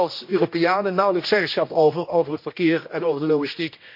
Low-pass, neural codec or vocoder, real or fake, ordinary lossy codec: 5.4 kHz; codec, 16 kHz, 1 kbps, X-Codec, HuBERT features, trained on general audio; fake; MP3, 32 kbps